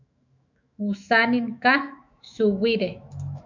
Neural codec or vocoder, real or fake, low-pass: autoencoder, 48 kHz, 128 numbers a frame, DAC-VAE, trained on Japanese speech; fake; 7.2 kHz